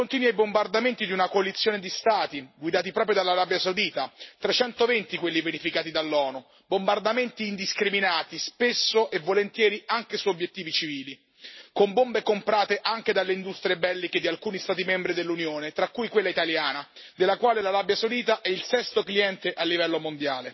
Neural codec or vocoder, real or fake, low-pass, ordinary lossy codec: none; real; 7.2 kHz; MP3, 24 kbps